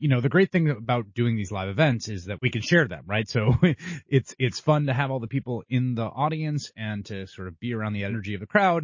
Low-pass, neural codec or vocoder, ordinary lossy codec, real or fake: 7.2 kHz; none; MP3, 32 kbps; real